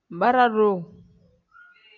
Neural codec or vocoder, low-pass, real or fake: none; 7.2 kHz; real